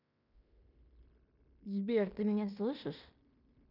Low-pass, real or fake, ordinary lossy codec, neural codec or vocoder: 5.4 kHz; fake; none; codec, 16 kHz in and 24 kHz out, 0.9 kbps, LongCat-Audio-Codec, fine tuned four codebook decoder